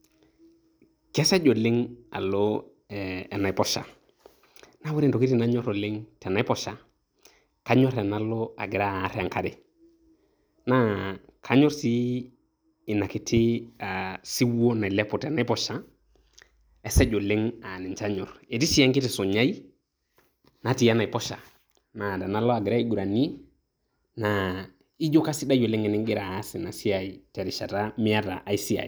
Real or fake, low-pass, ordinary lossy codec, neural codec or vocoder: real; none; none; none